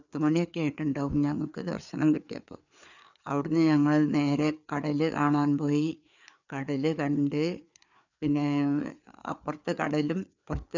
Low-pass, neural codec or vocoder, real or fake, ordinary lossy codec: 7.2 kHz; codec, 16 kHz, 4 kbps, FreqCodec, larger model; fake; none